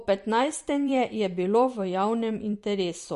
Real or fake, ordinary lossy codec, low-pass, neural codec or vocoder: fake; MP3, 48 kbps; 14.4 kHz; vocoder, 44.1 kHz, 128 mel bands every 512 samples, BigVGAN v2